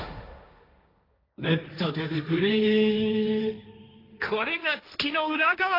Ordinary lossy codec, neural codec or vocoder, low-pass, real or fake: AAC, 32 kbps; codec, 16 kHz, 1.1 kbps, Voila-Tokenizer; 5.4 kHz; fake